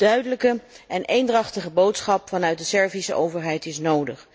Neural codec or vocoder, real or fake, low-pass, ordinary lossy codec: none; real; none; none